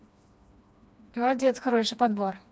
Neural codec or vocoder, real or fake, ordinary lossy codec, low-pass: codec, 16 kHz, 2 kbps, FreqCodec, smaller model; fake; none; none